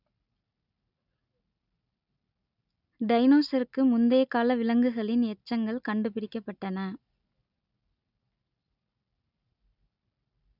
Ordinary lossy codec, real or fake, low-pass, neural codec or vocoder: none; real; 5.4 kHz; none